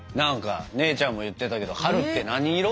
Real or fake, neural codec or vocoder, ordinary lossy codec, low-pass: real; none; none; none